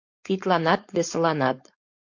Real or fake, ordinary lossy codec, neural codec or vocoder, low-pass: fake; MP3, 48 kbps; codec, 16 kHz, 4.8 kbps, FACodec; 7.2 kHz